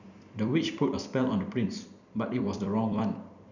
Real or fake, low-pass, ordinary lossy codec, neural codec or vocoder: fake; 7.2 kHz; none; vocoder, 44.1 kHz, 128 mel bands every 256 samples, BigVGAN v2